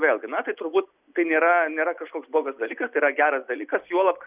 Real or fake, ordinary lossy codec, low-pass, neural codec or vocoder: real; Opus, 64 kbps; 3.6 kHz; none